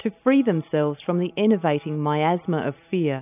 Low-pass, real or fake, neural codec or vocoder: 3.6 kHz; real; none